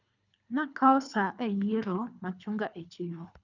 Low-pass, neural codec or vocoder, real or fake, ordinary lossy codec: 7.2 kHz; codec, 24 kHz, 3 kbps, HILCodec; fake; none